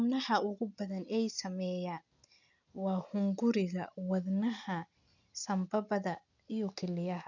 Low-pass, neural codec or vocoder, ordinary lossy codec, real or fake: 7.2 kHz; none; none; real